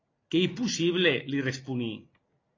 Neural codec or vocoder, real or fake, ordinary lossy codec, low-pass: none; real; AAC, 32 kbps; 7.2 kHz